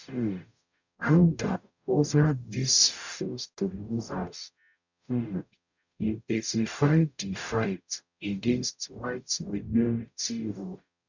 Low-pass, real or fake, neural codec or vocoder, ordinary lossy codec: 7.2 kHz; fake; codec, 44.1 kHz, 0.9 kbps, DAC; none